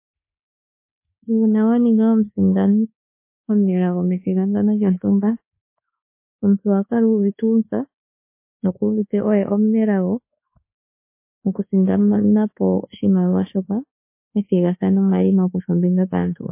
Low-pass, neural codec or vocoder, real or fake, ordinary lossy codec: 3.6 kHz; codec, 24 kHz, 1.2 kbps, DualCodec; fake; MP3, 24 kbps